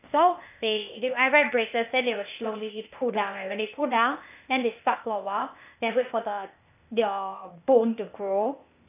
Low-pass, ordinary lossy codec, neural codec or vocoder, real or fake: 3.6 kHz; none; codec, 16 kHz, 0.8 kbps, ZipCodec; fake